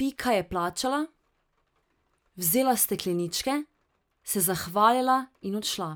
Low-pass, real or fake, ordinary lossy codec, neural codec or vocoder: none; real; none; none